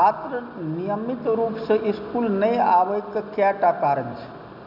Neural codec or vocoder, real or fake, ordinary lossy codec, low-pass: none; real; none; 5.4 kHz